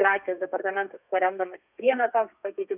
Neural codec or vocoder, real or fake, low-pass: codec, 32 kHz, 1.9 kbps, SNAC; fake; 3.6 kHz